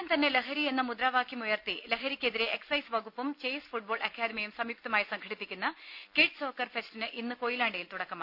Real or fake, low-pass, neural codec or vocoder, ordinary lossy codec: real; 5.4 kHz; none; none